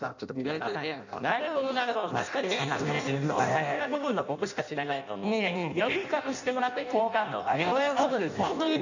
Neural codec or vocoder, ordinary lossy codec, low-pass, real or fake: codec, 16 kHz in and 24 kHz out, 0.6 kbps, FireRedTTS-2 codec; none; 7.2 kHz; fake